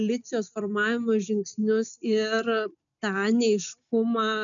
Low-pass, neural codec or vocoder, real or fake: 7.2 kHz; none; real